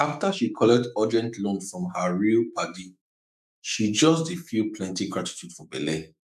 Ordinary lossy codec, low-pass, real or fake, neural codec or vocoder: none; 14.4 kHz; fake; autoencoder, 48 kHz, 128 numbers a frame, DAC-VAE, trained on Japanese speech